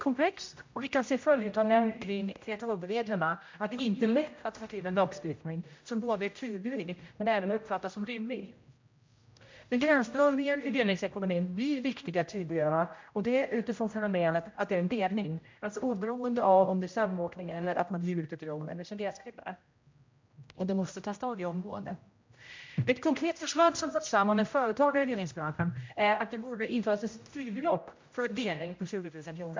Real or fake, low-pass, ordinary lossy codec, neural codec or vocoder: fake; 7.2 kHz; MP3, 48 kbps; codec, 16 kHz, 0.5 kbps, X-Codec, HuBERT features, trained on general audio